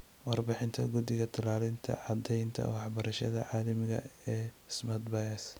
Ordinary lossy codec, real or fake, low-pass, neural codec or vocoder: none; real; none; none